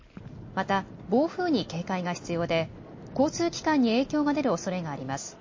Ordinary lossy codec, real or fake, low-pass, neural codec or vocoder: MP3, 32 kbps; real; 7.2 kHz; none